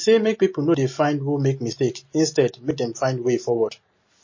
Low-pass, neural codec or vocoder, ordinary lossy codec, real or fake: 7.2 kHz; none; MP3, 32 kbps; real